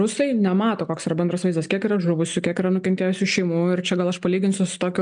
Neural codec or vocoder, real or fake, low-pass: none; real; 9.9 kHz